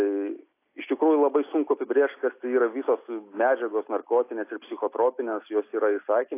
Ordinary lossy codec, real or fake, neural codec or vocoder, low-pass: AAC, 24 kbps; real; none; 3.6 kHz